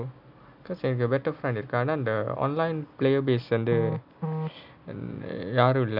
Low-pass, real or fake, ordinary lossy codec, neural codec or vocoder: 5.4 kHz; real; none; none